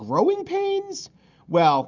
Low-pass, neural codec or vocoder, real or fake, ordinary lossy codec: 7.2 kHz; none; real; Opus, 64 kbps